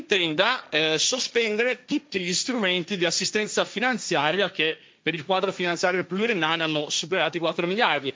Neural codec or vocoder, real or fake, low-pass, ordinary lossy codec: codec, 16 kHz, 1.1 kbps, Voila-Tokenizer; fake; none; none